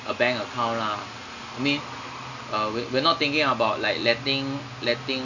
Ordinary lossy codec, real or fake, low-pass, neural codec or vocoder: none; real; 7.2 kHz; none